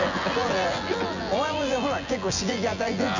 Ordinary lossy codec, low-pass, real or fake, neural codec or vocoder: none; 7.2 kHz; fake; vocoder, 24 kHz, 100 mel bands, Vocos